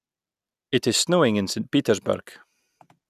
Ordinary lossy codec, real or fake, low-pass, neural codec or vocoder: none; real; 14.4 kHz; none